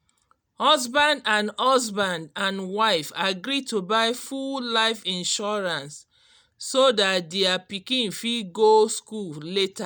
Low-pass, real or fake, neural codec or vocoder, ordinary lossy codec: none; real; none; none